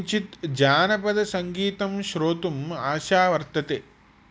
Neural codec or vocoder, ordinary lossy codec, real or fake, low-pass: none; none; real; none